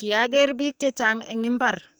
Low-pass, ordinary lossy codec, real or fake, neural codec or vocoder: none; none; fake; codec, 44.1 kHz, 2.6 kbps, SNAC